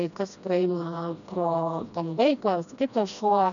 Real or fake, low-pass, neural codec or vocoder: fake; 7.2 kHz; codec, 16 kHz, 1 kbps, FreqCodec, smaller model